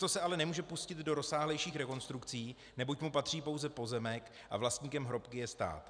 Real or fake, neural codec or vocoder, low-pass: real; none; 9.9 kHz